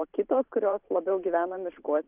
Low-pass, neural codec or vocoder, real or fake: 3.6 kHz; vocoder, 44.1 kHz, 128 mel bands every 512 samples, BigVGAN v2; fake